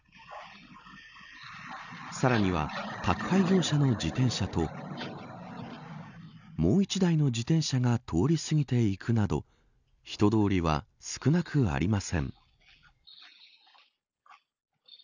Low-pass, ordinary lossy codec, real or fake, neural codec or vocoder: 7.2 kHz; none; real; none